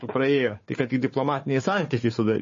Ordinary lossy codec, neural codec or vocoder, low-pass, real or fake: MP3, 32 kbps; codec, 16 kHz, 4 kbps, FunCodec, trained on Chinese and English, 50 frames a second; 7.2 kHz; fake